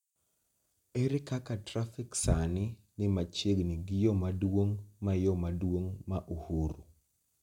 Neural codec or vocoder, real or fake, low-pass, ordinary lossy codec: none; real; 19.8 kHz; none